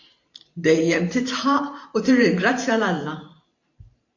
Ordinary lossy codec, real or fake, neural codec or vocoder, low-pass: AAC, 48 kbps; fake; vocoder, 44.1 kHz, 128 mel bands every 512 samples, BigVGAN v2; 7.2 kHz